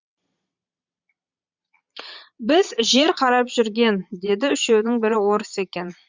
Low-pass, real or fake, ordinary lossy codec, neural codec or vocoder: 7.2 kHz; fake; Opus, 64 kbps; vocoder, 22.05 kHz, 80 mel bands, Vocos